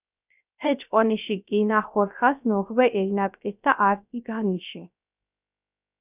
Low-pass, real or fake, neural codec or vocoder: 3.6 kHz; fake; codec, 16 kHz, 0.3 kbps, FocalCodec